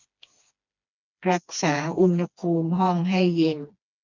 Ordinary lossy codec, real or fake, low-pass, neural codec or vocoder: none; fake; 7.2 kHz; codec, 16 kHz, 2 kbps, FreqCodec, smaller model